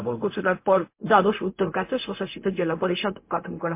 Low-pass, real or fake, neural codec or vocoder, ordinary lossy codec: 3.6 kHz; fake; codec, 16 kHz, 0.4 kbps, LongCat-Audio-Codec; MP3, 24 kbps